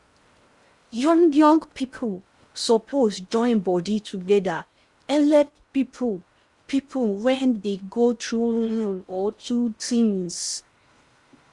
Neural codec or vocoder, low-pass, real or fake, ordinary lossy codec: codec, 16 kHz in and 24 kHz out, 0.6 kbps, FocalCodec, streaming, 4096 codes; 10.8 kHz; fake; Opus, 64 kbps